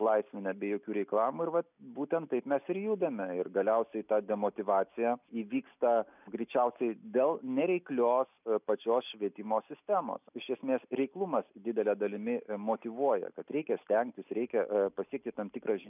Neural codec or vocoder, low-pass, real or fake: none; 3.6 kHz; real